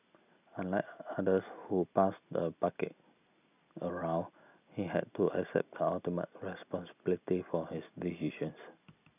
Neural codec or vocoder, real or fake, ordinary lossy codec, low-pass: none; real; none; 3.6 kHz